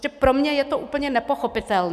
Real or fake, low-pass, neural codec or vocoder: real; 14.4 kHz; none